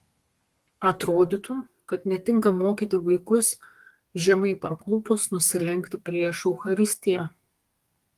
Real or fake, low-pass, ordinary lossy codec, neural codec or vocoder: fake; 14.4 kHz; Opus, 24 kbps; codec, 32 kHz, 1.9 kbps, SNAC